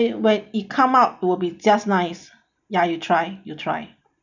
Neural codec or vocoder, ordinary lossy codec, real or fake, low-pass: none; none; real; 7.2 kHz